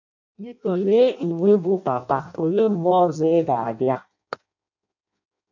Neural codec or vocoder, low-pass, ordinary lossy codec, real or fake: codec, 16 kHz in and 24 kHz out, 0.6 kbps, FireRedTTS-2 codec; 7.2 kHz; none; fake